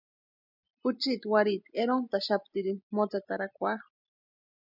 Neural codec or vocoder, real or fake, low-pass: none; real; 5.4 kHz